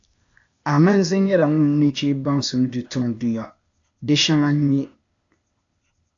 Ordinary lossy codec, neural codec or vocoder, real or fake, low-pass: AAC, 64 kbps; codec, 16 kHz, 0.8 kbps, ZipCodec; fake; 7.2 kHz